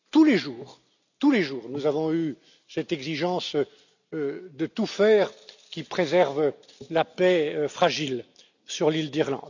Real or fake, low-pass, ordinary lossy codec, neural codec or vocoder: real; 7.2 kHz; none; none